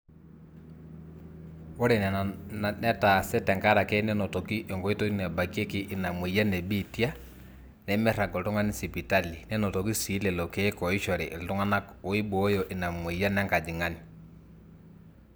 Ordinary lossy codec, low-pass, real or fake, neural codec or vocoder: none; none; real; none